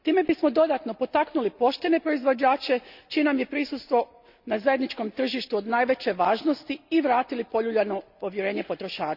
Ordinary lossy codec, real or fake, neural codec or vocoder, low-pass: Opus, 64 kbps; real; none; 5.4 kHz